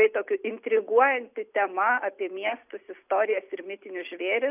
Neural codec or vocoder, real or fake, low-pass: none; real; 3.6 kHz